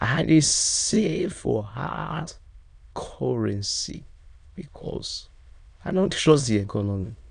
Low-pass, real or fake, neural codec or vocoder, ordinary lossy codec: 9.9 kHz; fake; autoencoder, 22.05 kHz, a latent of 192 numbers a frame, VITS, trained on many speakers; none